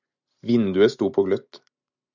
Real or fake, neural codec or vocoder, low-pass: real; none; 7.2 kHz